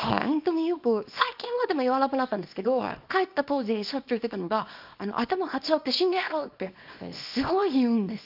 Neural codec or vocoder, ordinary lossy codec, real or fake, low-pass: codec, 24 kHz, 0.9 kbps, WavTokenizer, small release; AAC, 48 kbps; fake; 5.4 kHz